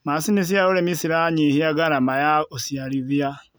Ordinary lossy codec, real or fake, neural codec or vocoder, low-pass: none; real; none; none